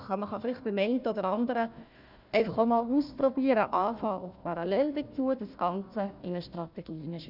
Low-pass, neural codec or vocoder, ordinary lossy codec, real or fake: 5.4 kHz; codec, 16 kHz, 1 kbps, FunCodec, trained on Chinese and English, 50 frames a second; none; fake